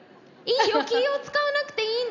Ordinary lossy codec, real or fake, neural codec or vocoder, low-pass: none; real; none; 7.2 kHz